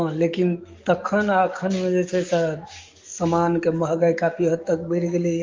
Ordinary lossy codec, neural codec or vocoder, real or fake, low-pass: Opus, 32 kbps; codec, 44.1 kHz, 7.8 kbps, DAC; fake; 7.2 kHz